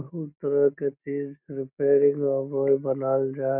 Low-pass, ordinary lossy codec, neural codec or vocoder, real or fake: 3.6 kHz; none; none; real